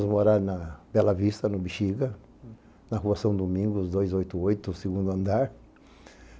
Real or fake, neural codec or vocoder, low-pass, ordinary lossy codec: real; none; none; none